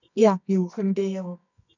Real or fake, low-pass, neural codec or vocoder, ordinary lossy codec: fake; 7.2 kHz; codec, 24 kHz, 0.9 kbps, WavTokenizer, medium music audio release; none